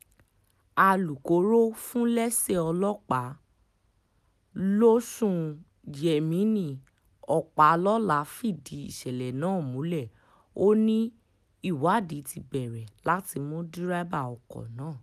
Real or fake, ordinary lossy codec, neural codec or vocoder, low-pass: real; none; none; 14.4 kHz